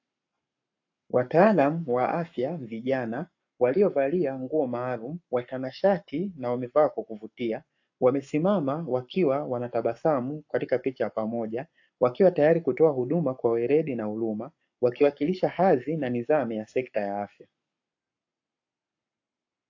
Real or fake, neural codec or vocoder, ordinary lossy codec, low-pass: fake; codec, 44.1 kHz, 7.8 kbps, Pupu-Codec; AAC, 48 kbps; 7.2 kHz